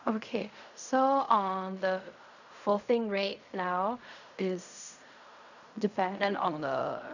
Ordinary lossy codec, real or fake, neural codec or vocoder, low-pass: none; fake; codec, 16 kHz in and 24 kHz out, 0.4 kbps, LongCat-Audio-Codec, fine tuned four codebook decoder; 7.2 kHz